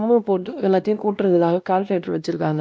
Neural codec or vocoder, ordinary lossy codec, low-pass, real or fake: codec, 16 kHz, 1 kbps, X-Codec, WavLM features, trained on Multilingual LibriSpeech; none; none; fake